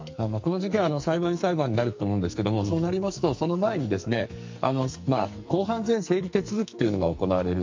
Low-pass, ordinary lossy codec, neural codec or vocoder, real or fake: 7.2 kHz; MP3, 48 kbps; codec, 44.1 kHz, 2.6 kbps, SNAC; fake